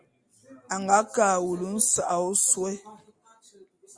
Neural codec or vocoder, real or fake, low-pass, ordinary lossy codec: none; real; 9.9 kHz; Opus, 64 kbps